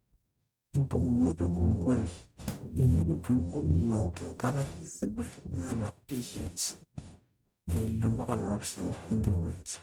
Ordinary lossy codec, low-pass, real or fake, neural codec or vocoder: none; none; fake; codec, 44.1 kHz, 0.9 kbps, DAC